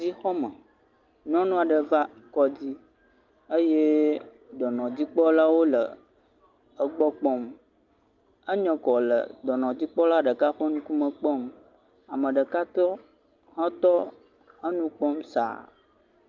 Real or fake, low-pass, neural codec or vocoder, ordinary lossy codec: real; 7.2 kHz; none; Opus, 24 kbps